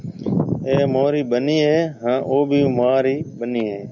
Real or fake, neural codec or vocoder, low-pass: real; none; 7.2 kHz